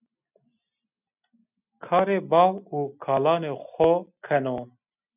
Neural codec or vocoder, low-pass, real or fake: none; 3.6 kHz; real